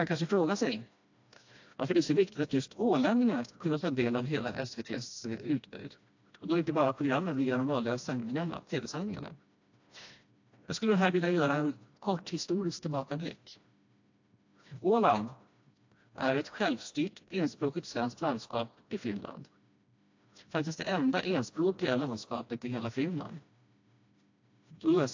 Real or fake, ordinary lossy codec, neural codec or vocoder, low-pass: fake; AAC, 48 kbps; codec, 16 kHz, 1 kbps, FreqCodec, smaller model; 7.2 kHz